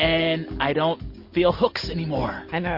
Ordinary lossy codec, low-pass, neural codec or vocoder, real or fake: MP3, 32 kbps; 5.4 kHz; none; real